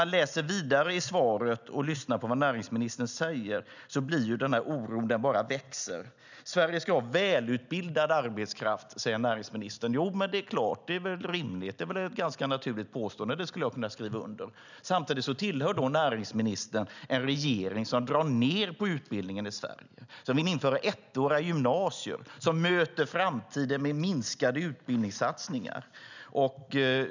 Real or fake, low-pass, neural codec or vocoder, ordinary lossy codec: real; 7.2 kHz; none; none